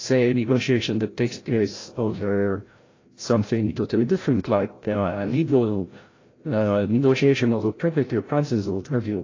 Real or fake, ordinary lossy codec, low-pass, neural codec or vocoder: fake; AAC, 32 kbps; 7.2 kHz; codec, 16 kHz, 0.5 kbps, FreqCodec, larger model